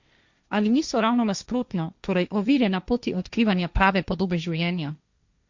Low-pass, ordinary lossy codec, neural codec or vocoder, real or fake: 7.2 kHz; Opus, 64 kbps; codec, 16 kHz, 1.1 kbps, Voila-Tokenizer; fake